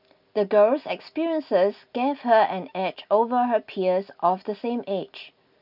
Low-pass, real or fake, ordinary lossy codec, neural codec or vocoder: 5.4 kHz; real; none; none